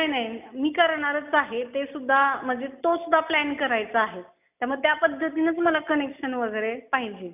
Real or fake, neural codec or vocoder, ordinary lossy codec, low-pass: real; none; none; 3.6 kHz